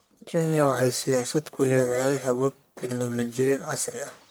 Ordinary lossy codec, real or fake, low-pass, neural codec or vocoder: none; fake; none; codec, 44.1 kHz, 1.7 kbps, Pupu-Codec